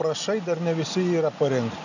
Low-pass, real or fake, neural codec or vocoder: 7.2 kHz; real; none